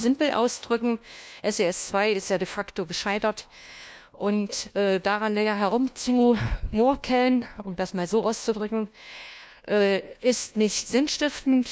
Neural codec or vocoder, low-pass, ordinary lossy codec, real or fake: codec, 16 kHz, 1 kbps, FunCodec, trained on LibriTTS, 50 frames a second; none; none; fake